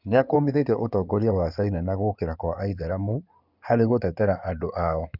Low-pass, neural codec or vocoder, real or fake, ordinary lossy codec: 5.4 kHz; codec, 16 kHz in and 24 kHz out, 2.2 kbps, FireRedTTS-2 codec; fake; none